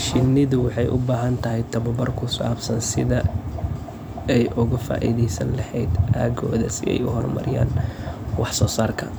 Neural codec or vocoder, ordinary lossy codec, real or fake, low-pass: vocoder, 44.1 kHz, 128 mel bands every 256 samples, BigVGAN v2; none; fake; none